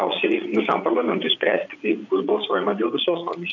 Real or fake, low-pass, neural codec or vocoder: fake; 7.2 kHz; vocoder, 22.05 kHz, 80 mel bands, Vocos